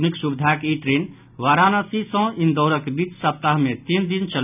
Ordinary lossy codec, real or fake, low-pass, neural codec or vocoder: none; real; 3.6 kHz; none